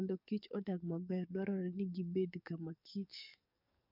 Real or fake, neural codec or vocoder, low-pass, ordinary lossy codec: fake; codec, 44.1 kHz, 7.8 kbps, DAC; 5.4 kHz; none